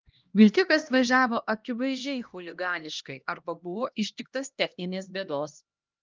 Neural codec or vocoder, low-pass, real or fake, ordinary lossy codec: codec, 16 kHz, 1 kbps, X-Codec, HuBERT features, trained on LibriSpeech; 7.2 kHz; fake; Opus, 32 kbps